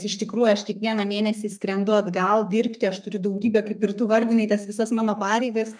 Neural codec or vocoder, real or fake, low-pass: codec, 32 kHz, 1.9 kbps, SNAC; fake; 9.9 kHz